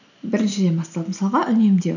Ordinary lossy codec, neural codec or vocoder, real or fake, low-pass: none; none; real; 7.2 kHz